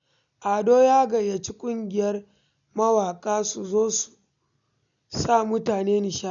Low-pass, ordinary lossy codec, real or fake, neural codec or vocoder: 7.2 kHz; none; real; none